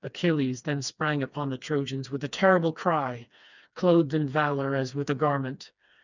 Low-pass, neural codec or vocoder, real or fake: 7.2 kHz; codec, 16 kHz, 2 kbps, FreqCodec, smaller model; fake